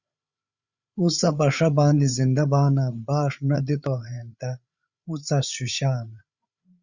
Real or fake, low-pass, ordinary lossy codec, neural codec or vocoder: fake; 7.2 kHz; Opus, 64 kbps; codec, 16 kHz, 8 kbps, FreqCodec, larger model